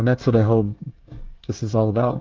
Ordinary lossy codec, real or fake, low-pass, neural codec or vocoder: Opus, 32 kbps; fake; 7.2 kHz; codec, 24 kHz, 1 kbps, SNAC